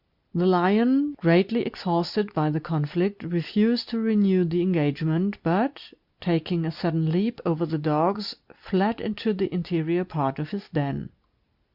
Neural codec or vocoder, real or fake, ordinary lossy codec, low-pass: none; real; Opus, 64 kbps; 5.4 kHz